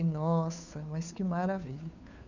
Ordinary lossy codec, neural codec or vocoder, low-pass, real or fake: none; codec, 16 kHz, 2 kbps, FunCodec, trained on Chinese and English, 25 frames a second; 7.2 kHz; fake